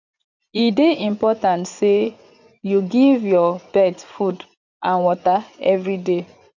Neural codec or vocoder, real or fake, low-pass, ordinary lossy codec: vocoder, 24 kHz, 100 mel bands, Vocos; fake; 7.2 kHz; none